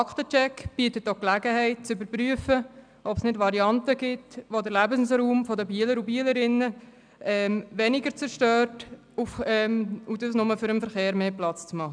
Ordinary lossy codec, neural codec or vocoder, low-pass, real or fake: none; none; 9.9 kHz; real